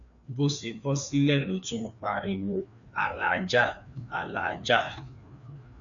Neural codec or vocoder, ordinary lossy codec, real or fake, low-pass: codec, 16 kHz, 2 kbps, FreqCodec, larger model; none; fake; 7.2 kHz